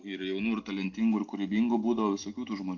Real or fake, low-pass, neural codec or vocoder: real; 7.2 kHz; none